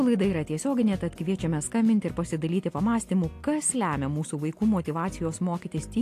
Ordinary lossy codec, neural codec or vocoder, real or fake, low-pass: AAC, 64 kbps; none; real; 14.4 kHz